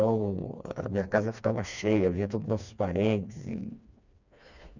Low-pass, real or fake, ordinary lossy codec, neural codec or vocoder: 7.2 kHz; fake; none; codec, 16 kHz, 2 kbps, FreqCodec, smaller model